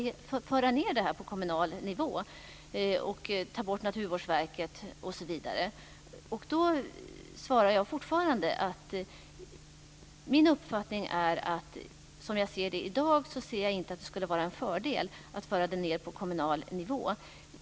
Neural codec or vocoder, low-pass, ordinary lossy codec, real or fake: none; none; none; real